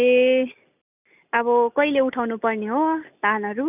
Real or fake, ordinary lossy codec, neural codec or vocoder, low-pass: real; none; none; 3.6 kHz